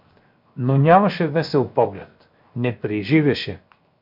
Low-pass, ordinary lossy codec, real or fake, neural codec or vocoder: 5.4 kHz; MP3, 48 kbps; fake; codec, 16 kHz, 0.7 kbps, FocalCodec